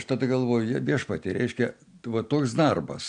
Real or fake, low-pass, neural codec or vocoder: real; 9.9 kHz; none